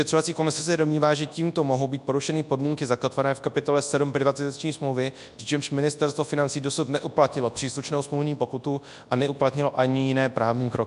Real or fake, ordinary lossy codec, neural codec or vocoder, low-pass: fake; AAC, 96 kbps; codec, 24 kHz, 0.9 kbps, WavTokenizer, large speech release; 10.8 kHz